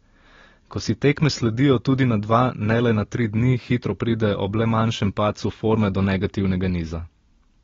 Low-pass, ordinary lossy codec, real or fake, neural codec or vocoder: 7.2 kHz; AAC, 24 kbps; real; none